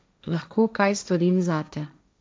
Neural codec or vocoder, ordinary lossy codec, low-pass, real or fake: codec, 16 kHz, 1.1 kbps, Voila-Tokenizer; none; none; fake